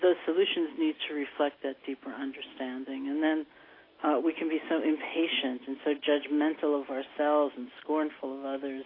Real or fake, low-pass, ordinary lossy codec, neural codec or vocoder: real; 5.4 kHz; AAC, 24 kbps; none